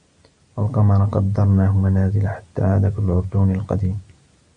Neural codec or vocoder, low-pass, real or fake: none; 9.9 kHz; real